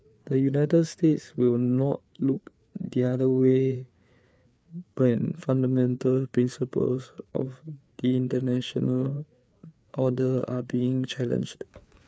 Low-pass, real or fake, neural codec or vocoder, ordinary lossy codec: none; fake; codec, 16 kHz, 4 kbps, FreqCodec, larger model; none